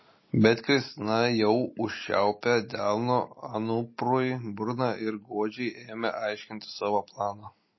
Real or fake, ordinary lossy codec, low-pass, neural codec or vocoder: real; MP3, 24 kbps; 7.2 kHz; none